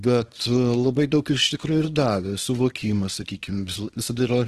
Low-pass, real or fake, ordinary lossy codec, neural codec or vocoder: 10.8 kHz; fake; Opus, 16 kbps; codec, 24 kHz, 0.9 kbps, WavTokenizer, small release